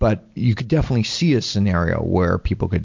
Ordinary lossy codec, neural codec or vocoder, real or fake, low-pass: MP3, 48 kbps; none; real; 7.2 kHz